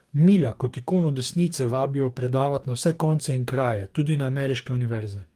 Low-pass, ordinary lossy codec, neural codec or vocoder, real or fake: 14.4 kHz; Opus, 32 kbps; codec, 44.1 kHz, 2.6 kbps, DAC; fake